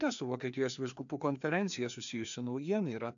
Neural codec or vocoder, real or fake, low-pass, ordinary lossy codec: codec, 16 kHz, 2 kbps, FreqCodec, larger model; fake; 7.2 kHz; AAC, 64 kbps